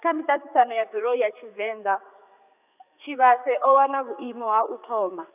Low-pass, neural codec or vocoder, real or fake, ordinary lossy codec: 3.6 kHz; codec, 16 kHz, 4 kbps, X-Codec, HuBERT features, trained on general audio; fake; none